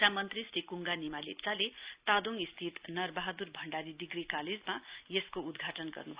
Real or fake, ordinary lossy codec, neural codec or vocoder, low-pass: real; Opus, 64 kbps; none; 3.6 kHz